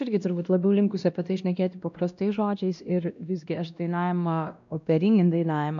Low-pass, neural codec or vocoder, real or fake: 7.2 kHz; codec, 16 kHz, 1 kbps, X-Codec, WavLM features, trained on Multilingual LibriSpeech; fake